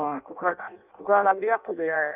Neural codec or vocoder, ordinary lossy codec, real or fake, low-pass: codec, 16 kHz in and 24 kHz out, 0.6 kbps, FireRedTTS-2 codec; none; fake; 3.6 kHz